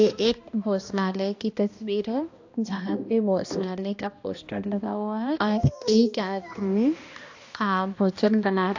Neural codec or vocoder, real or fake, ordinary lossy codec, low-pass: codec, 16 kHz, 1 kbps, X-Codec, HuBERT features, trained on balanced general audio; fake; AAC, 48 kbps; 7.2 kHz